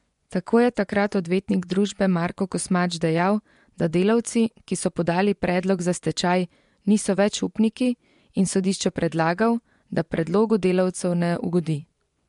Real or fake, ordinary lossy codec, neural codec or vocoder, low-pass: fake; MP3, 64 kbps; vocoder, 24 kHz, 100 mel bands, Vocos; 10.8 kHz